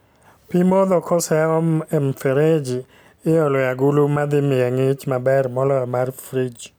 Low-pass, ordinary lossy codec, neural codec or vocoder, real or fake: none; none; none; real